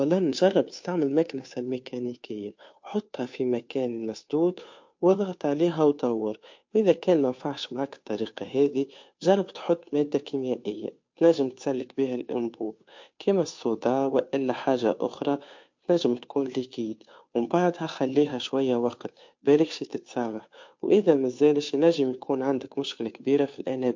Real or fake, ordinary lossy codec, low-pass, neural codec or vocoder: fake; MP3, 48 kbps; 7.2 kHz; codec, 16 kHz, 2 kbps, FunCodec, trained on Chinese and English, 25 frames a second